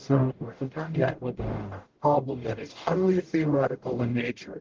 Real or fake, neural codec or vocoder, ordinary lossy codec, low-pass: fake; codec, 44.1 kHz, 0.9 kbps, DAC; Opus, 16 kbps; 7.2 kHz